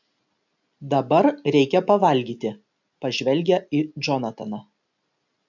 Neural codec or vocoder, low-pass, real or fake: none; 7.2 kHz; real